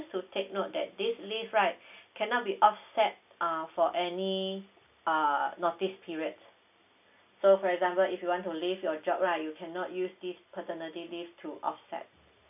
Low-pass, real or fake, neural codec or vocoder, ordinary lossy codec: 3.6 kHz; real; none; none